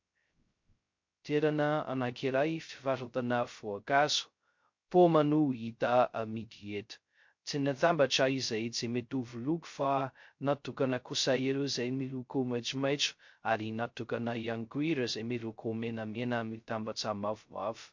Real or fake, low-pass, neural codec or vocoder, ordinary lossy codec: fake; 7.2 kHz; codec, 16 kHz, 0.2 kbps, FocalCodec; MP3, 48 kbps